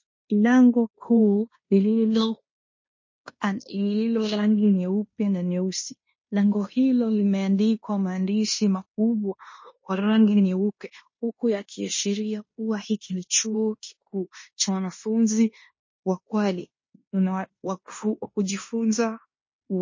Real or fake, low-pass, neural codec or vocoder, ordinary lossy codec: fake; 7.2 kHz; codec, 16 kHz in and 24 kHz out, 0.9 kbps, LongCat-Audio-Codec, fine tuned four codebook decoder; MP3, 32 kbps